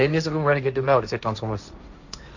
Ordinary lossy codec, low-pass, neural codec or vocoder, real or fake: none; 7.2 kHz; codec, 16 kHz, 1.1 kbps, Voila-Tokenizer; fake